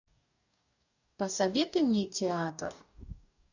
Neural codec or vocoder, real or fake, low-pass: codec, 44.1 kHz, 2.6 kbps, DAC; fake; 7.2 kHz